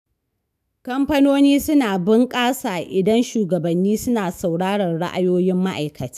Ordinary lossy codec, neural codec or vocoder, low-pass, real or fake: none; autoencoder, 48 kHz, 128 numbers a frame, DAC-VAE, trained on Japanese speech; 14.4 kHz; fake